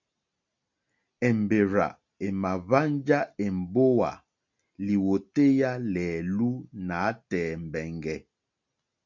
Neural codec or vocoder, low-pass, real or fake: none; 7.2 kHz; real